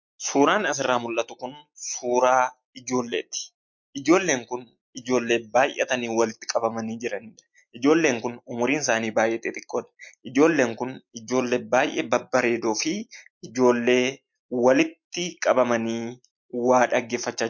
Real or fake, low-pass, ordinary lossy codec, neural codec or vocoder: real; 7.2 kHz; MP3, 48 kbps; none